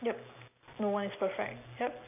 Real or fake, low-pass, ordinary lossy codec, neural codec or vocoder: real; 3.6 kHz; none; none